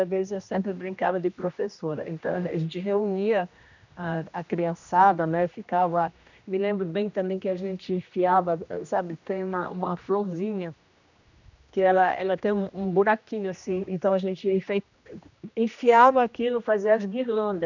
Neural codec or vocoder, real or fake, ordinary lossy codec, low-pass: codec, 16 kHz, 1 kbps, X-Codec, HuBERT features, trained on general audio; fake; none; 7.2 kHz